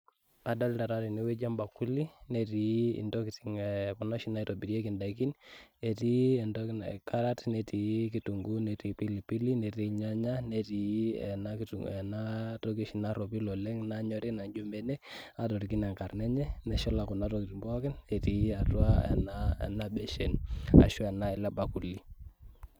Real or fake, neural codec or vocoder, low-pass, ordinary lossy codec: real; none; none; none